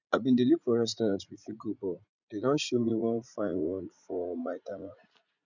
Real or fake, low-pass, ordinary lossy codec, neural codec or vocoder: fake; 7.2 kHz; none; vocoder, 44.1 kHz, 80 mel bands, Vocos